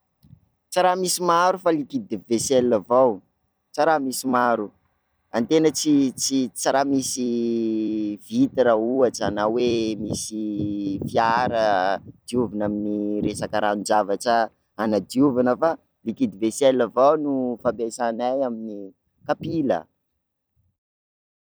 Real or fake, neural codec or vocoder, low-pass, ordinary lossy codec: fake; vocoder, 44.1 kHz, 128 mel bands every 256 samples, BigVGAN v2; none; none